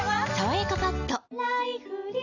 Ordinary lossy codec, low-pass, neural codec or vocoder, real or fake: none; 7.2 kHz; none; real